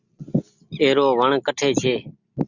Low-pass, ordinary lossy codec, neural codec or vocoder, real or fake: 7.2 kHz; Opus, 64 kbps; none; real